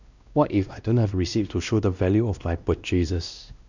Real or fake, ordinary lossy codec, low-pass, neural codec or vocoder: fake; none; 7.2 kHz; codec, 16 kHz, 1 kbps, X-Codec, WavLM features, trained on Multilingual LibriSpeech